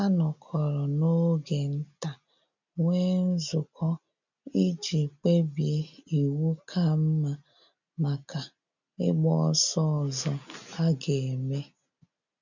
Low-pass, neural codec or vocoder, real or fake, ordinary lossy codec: 7.2 kHz; none; real; none